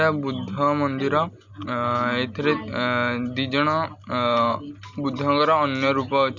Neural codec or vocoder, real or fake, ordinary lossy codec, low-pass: none; real; none; 7.2 kHz